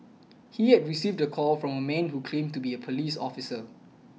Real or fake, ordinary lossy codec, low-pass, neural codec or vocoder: real; none; none; none